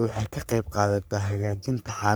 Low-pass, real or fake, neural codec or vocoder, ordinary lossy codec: none; fake; codec, 44.1 kHz, 3.4 kbps, Pupu-Codec; none